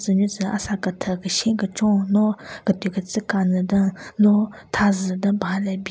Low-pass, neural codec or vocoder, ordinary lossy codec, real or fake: none; none; none; real